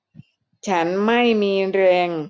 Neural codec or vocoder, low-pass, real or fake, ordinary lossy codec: none; none; real; none